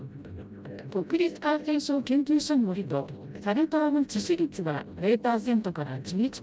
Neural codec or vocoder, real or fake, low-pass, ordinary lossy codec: codec, 16 kHz, 0.5 kbps, FreqCodec, smaller model; fake; none; none